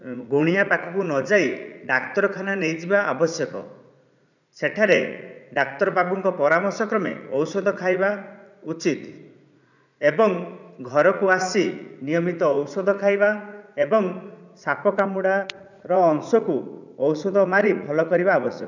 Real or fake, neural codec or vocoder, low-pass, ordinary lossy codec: fake; vocoder, 44.1 kHz, 80 mel bands, Vocos; 7.2 kHz; none